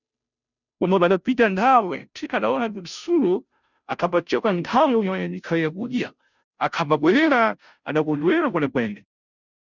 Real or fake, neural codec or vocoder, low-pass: fake; codec, 16 kHz, 0.5 kbps, FunCodec, trained on Chinese and English, 25 frames a second; 7.2 kHz